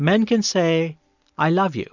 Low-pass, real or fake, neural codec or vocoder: 7.2 kHz; real; none